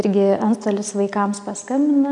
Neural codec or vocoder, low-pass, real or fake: none; 10.8 kHz; real